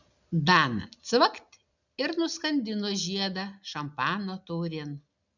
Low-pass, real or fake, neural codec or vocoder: 7.2 kHz; real; none